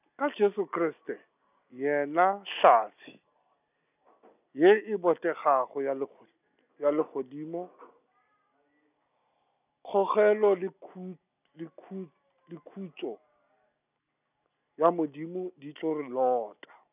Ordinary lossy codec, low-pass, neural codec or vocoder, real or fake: none; 3.6 kHz; none; real